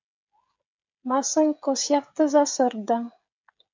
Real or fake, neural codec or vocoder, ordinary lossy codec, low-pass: fake; codec, 16 kHz, 16 kbps, FreqCodec, smaller model; MP3, 64 kbps; 7.2 kHz